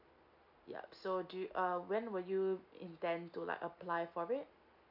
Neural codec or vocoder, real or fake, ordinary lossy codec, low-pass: none; real; MP3, 48 kbps; 5.4 kHz